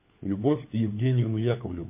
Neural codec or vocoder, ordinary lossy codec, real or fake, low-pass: codec, 16 kHz, 4 kbps, FunCodec, trained on LibriTTS, 50 frames a second; MP3, 24 kbps; fake; 3.6 kHz